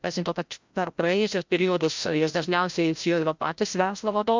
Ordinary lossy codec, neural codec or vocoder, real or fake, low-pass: MP3, 64 kbps; codec, 16 kHz, 0.5 kbps, FreqCodec, larger model; fake; 7.2 kHz